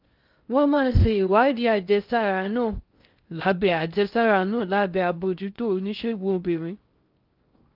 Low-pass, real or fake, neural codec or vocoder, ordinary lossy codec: 5.4 kHz; fake; codec, 16 kHz in and 24 kHz out, 0.6 kbps, FocalCodec, streaming, 2048 codes; Opus, 24 kbps